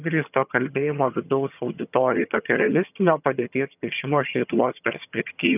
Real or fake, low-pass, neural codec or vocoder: fake; 3.6 kHz; vocoder, 22.05 kHz, 80 mel bands, HiFi-GAN